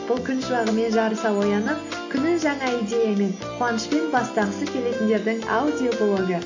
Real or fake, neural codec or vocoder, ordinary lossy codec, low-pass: real; none; AAC, 48 kbps; 7.2 kHz